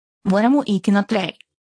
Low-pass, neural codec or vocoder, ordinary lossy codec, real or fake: 9.9 kHz; codec, 24 kHz, 0.9 kbps, WavTokenizer, small release; AAC, 48 kbps; fake